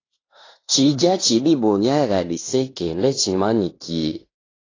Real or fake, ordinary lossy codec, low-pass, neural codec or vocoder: fake; AAC, 32 kbps; 7.2 kHz; codec, 16 kHz in and 24 kHz out, 0.9 kbps, LongCat-Audio-Codec, fine tuned four codebook decoder